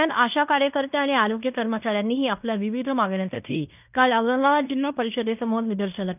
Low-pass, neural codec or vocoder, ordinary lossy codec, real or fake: 3.6 kHz; codec, 16 kHz in and 24 kHz out, 0.9 kbps, LongCat-Audio-Codec, fine tuned four codebook decoder; none; fake